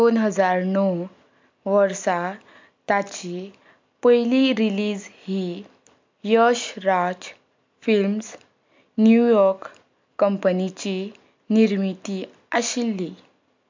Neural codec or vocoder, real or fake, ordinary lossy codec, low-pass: none; real; MP3, 64 kbps; 7.2 kHz